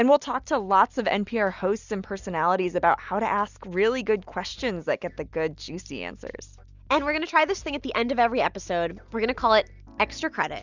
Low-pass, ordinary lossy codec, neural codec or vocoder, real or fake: 7.2 kHz; Opus, 64 kbps; none; real